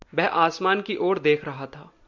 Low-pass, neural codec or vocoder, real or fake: 7.2 kHz; none; real